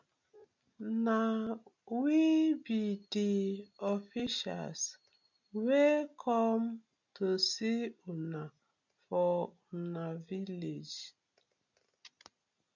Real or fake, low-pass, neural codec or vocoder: real; 7.2 kHz; none